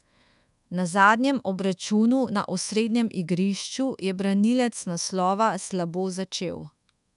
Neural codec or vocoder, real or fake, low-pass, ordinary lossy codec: codec, 24 kHz, 1.2 kbps, DualCodec; fake; 10.8 kHz; none